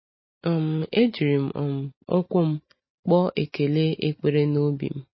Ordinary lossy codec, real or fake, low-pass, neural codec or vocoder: MP3, 24 kbps; real; 7.2 kHz; none